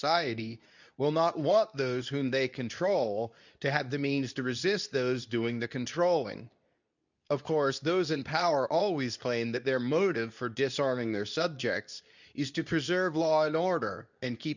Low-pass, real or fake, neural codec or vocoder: 7.2 kHz; fake; codec, 24 kHz, 0.9 kbps, WavTokenizer, medium speech release version 2